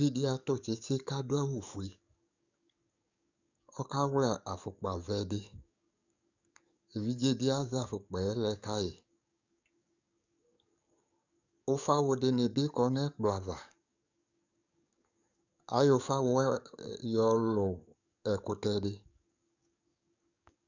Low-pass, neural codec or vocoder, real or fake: 7.2 kHz; codec, 44.1 kHz, 7.8 kbps, DAC; fake